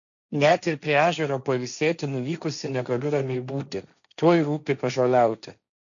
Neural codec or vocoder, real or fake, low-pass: codec, 16 kHz, 1.1 kbps, Voila-Tokenizer; fake; 7.2 kHz